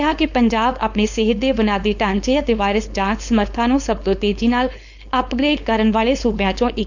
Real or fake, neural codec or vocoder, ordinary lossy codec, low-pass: fake; codec, 16 kHz, 4.8 kbps, FACodec; none; 7.2 kHz